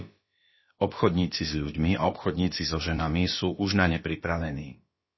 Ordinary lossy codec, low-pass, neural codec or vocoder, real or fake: MP3, 24 kbps; 7.2 kHz; codec, 16 kHz, about 1 kbps, DyCAST, with the encoder's durations; fake